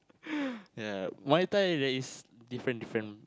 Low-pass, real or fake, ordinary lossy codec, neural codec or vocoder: none; real; none; none